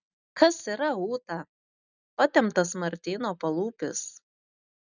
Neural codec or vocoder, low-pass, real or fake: none; 7.2 kHz; real